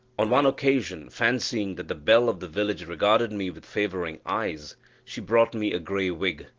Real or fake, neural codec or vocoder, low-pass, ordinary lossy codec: real; none; 7.2 kHz; Opus, 16 kbps